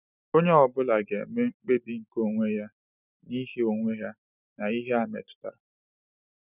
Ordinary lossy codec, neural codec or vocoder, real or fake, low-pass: none; none; real; 3.6 kHz